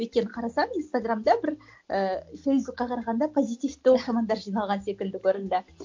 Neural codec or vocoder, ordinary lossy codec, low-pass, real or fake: none; MP3, 48 kbps; 7.2 kHz; real